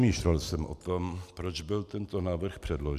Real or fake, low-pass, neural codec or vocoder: real; 14.4 kHz; none